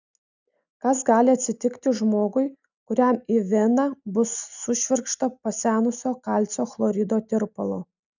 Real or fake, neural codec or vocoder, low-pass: real; none; 7.2 kHz